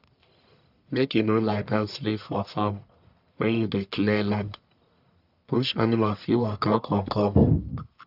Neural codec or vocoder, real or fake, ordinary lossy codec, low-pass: codec, 44.1 kHz, 1.7 kbps, Pupu-Codec; fake; none; 5.4 kHz